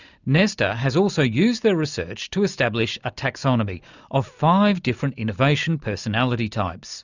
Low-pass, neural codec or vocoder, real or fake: 7.2 kHz; none; real